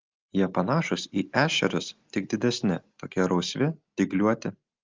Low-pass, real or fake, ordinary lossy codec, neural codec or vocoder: 7.2 kHz; real; Opus, 32 kbps; none